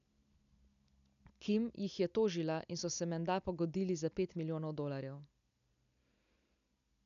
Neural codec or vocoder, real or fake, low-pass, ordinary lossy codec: none; real; 7.2 kHz; none